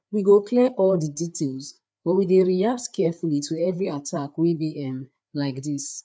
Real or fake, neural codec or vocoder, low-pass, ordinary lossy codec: fake; codec, 16 kHz, 4 kbps, FreqCodec, larger model; none; none